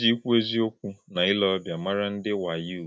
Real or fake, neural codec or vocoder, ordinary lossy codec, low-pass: real; none; none; none